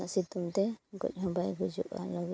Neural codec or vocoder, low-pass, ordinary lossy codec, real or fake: none; none; none; real